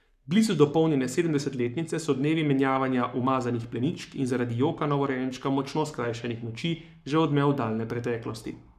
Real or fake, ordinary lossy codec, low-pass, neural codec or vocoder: fake; none; 14.4 kHz; codec, 44.1 kHz, 7.8 kbps, Pupu-Codec